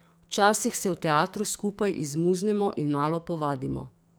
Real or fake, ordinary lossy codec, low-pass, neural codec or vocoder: fake; none; none; codec, 44.1 kHz, 2.6 kbps, SNAC